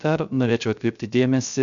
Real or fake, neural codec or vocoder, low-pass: fake; codec, 16 kHz, 0.3 kbps, FocalCodec; 7.2 kHz